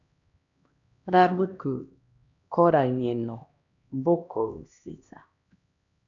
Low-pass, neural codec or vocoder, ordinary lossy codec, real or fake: 7.2 kHz; codec, 16 kHz, 1 kbps, X-Codec, HuBERT features, trained on LibriSpeech; AAC, 64 kbps; fake